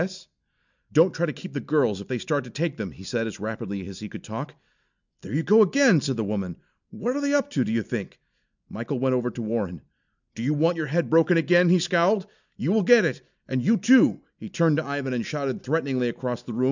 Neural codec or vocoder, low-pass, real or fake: none; 7.2 kHz; real